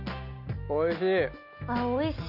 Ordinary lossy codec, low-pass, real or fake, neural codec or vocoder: none; 5.4 kHz; real; none